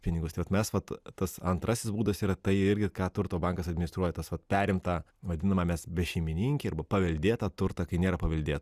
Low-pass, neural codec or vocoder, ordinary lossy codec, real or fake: 14.4 kHz; none; Opus, 64 kbps; real